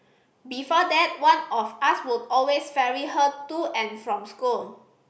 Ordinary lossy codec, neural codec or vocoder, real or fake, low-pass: none; none; real; none